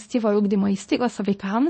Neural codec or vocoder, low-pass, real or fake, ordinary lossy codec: codec, 24 kHz, 0.9 kbps, WavTokenizer, small release; 10.8 kHz; fake; MP3, 32 kbps